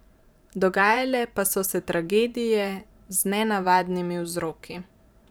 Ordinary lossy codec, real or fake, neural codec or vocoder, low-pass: none; fake; vocoder, 44.1 kHz, 128 mel bands every 512 samples, BigVGAN v2; none